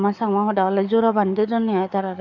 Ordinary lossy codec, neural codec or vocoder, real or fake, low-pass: none; codec, 44.1 kHz, 7.8 kbps, DAC; fake; 7.2 kHz